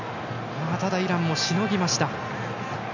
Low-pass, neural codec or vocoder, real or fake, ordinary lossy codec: 7.2 kHz; none; real; none